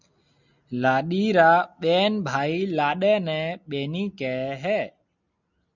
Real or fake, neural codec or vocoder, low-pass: real; none; 7.2 kHz